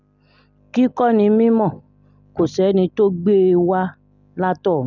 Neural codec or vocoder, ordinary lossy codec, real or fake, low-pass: none; none; real; 7.2 kHz